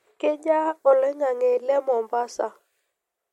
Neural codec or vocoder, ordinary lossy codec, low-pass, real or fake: none; MP3, 64 kbps; 19.8 kHz; real